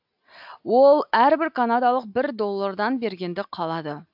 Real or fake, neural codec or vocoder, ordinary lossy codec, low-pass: real; none; AAC, 48 kbps; 5.4 kHz